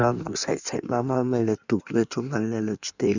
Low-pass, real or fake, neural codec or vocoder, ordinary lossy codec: 7.2 kHz; fake; codec, 16 kHz in and 24 kHz out, 1.1 kbps, FireRedTTS-2 codec; none